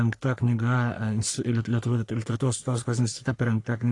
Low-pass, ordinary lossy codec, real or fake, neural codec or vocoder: 10.8 kHz; AAC, 48 kbps; fake; codec, 44.1 kHz, 3.4 kbps, Pupu-Codec